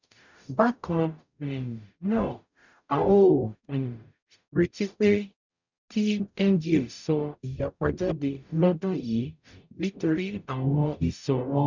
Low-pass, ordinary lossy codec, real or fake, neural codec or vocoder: 7.2 kHz; none; fake; codec, 44.1 kHz, 0.9 kbps, DAC